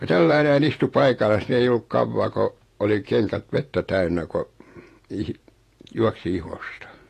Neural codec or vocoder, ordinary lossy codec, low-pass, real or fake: vocoder, 48 kHz, 128 mel bands, Vocos; AAC, 48 kbps; 14.4 kHz; fake